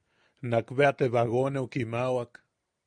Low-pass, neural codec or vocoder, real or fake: 9.9 kHz; none; real